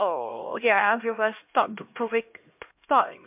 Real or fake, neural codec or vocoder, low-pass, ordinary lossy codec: fake; codec, 16 kHz, 1 kbps, X-Codec, HuBERT features, trained on LibriSpeech; 3.6 kHz; MP3, 32 kbps